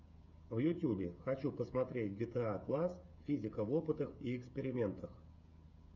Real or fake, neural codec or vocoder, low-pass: fake; codec, 16 kHz, 16 kbps, FreqCodec, smaller model; 7.2 kHz